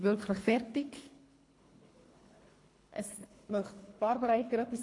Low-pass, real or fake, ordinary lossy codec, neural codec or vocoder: 10.8 kHz; fake; AAC, 48 kbps; codec, 24 kHz, 3 kbps, HILCodec